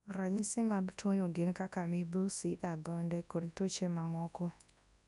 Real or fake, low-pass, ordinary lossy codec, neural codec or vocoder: fake; 10.8 kHz; none; codec, 24 kHz, 0.9 kbps, WavTokenizer, large speech release